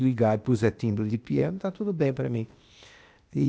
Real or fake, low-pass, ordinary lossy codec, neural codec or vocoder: fake; none; none; codec, 16 kHz, 0.8 kbps, ZipCodec